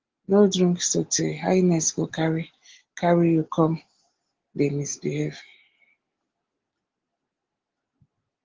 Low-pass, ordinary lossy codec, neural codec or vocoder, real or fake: 7.2 kHz; Opus, 16 kbps; none; real